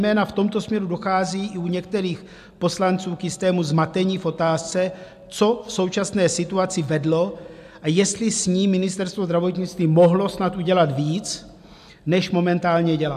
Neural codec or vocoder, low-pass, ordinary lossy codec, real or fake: none; 14.4 kHz; AAC, 96 kbps; real